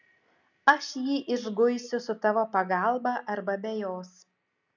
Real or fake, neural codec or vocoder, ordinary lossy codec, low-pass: real; none; MP3, 64 kbps; 7.2 kHz